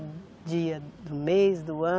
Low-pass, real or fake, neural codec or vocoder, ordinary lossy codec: none; real; none; none